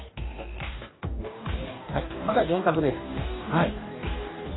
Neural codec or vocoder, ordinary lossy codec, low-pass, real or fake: codec, 44.1 kHz, 2.6 kbps, DAC; AAC, 16 kbps; 7.2 kHz; fake